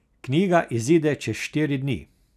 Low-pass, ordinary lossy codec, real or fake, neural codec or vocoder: 14.4 kHz; none; real; none